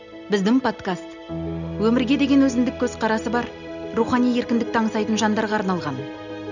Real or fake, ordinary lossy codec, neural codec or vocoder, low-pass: real; none; none; 7.2 kHz